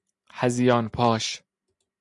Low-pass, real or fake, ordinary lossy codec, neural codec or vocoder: 10.8 kHz; real; MP3, 96 kbps; none